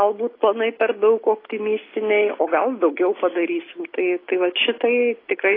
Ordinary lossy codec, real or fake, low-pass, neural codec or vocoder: AAC, 24 kbps; real; 5.4 kHz; none